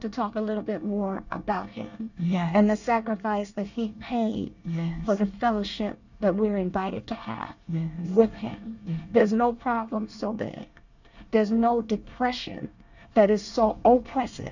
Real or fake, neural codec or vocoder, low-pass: fake; codec, 24 kHz, 1 kbps, SNAC; 7.2 kHz